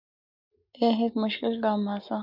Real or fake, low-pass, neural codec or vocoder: real; 5.4 kHz; none